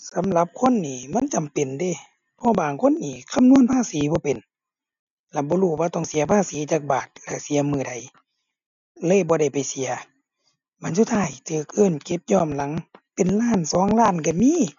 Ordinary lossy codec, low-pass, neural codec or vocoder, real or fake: none; 7.2 kHz; none; real